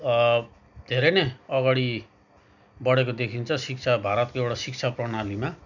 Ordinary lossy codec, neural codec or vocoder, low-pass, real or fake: none; none; 7.2 kHz; real